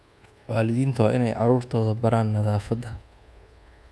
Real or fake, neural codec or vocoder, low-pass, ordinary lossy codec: fake; codec, 24 kHz, 1.2 kbps, DualCodec; none; none